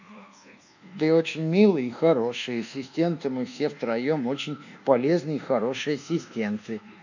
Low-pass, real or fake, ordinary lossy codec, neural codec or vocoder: 7.2 kHz; fake; none; codec, 24 kHz, 1.2 kbps, DualCodec